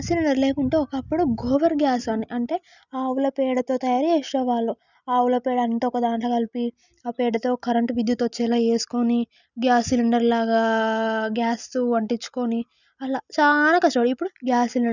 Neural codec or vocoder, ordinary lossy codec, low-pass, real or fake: none; none; 7.2 kHz; real